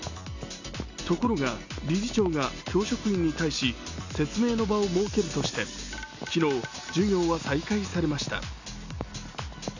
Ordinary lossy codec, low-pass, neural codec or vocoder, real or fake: none; 7.2 kHz; none; real